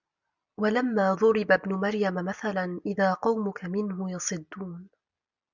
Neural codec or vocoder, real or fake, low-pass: none; real; 7.2 kHz